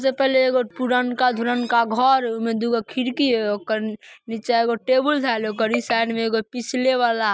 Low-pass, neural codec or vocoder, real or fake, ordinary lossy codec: none; none; real; none